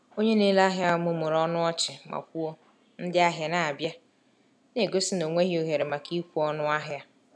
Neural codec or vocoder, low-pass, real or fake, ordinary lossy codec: none; none; real; none